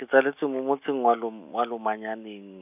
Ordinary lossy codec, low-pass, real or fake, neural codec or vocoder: none; 3.6 kHz; real; none